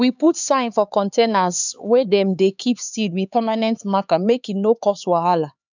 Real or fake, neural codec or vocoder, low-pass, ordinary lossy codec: fake; codec, 16 kHz, 4 kbps, X-Codec, HuBERT features, trained on LibriSpeech; 7.2 kHz; none